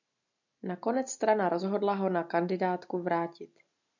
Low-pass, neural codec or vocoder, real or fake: 7.2 kHz; none; real